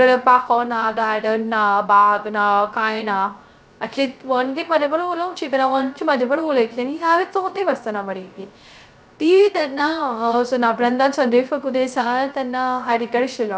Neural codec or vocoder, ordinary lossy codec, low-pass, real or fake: codec, 16 kHz, 0.3 kbps, FocalCodec; none; none; fake